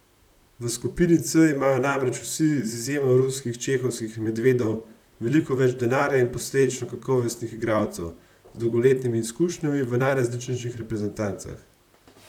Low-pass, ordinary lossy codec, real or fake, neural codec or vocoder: 19.8 kHz; none; fake; vocoder, 44.1 kHz, 128 mel bands, Pupu-Vocoder